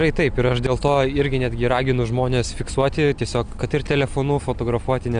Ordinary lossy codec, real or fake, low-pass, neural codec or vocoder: Opus, 64 kbps; real; 9.9 kHz; none